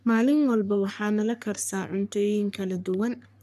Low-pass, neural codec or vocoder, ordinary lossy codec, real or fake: 14.4 kHz; codec, 44.1 kHz, 3.4 kbps, Pupu-Codec; none; fake